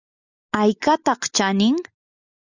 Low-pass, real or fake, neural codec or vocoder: 7.2 kHz; real; none